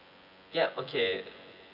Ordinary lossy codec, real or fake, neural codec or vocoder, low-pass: none; fake; vocoder, 24 kHz, 100 mel bands, Vocos; 5.4 kHz